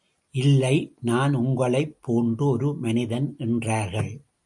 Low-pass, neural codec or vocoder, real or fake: 10.8 kHz; none; real